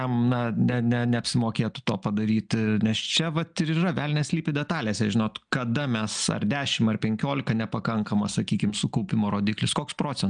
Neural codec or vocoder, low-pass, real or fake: none; 9.9 kHz; real